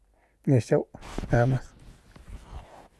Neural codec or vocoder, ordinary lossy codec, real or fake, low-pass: none; none; real; none